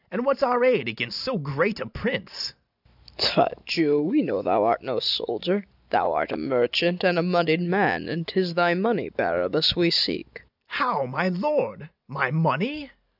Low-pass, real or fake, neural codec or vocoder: 5.4 kHz; real; none